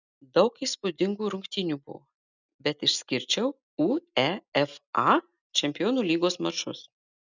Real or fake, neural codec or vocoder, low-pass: real; none; 7.2 kHz